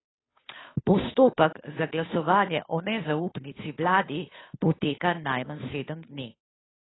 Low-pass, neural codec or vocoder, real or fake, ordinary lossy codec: 7.2 kHz; codec, 16 kHz, 2 kbps, FunCodec, trained on Chinese and English, 25 frames a second; fake; AAC, 16 kbps